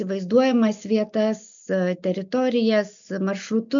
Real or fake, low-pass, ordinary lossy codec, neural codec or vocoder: real; 7.2 kHz; MP3, 64 kbps; none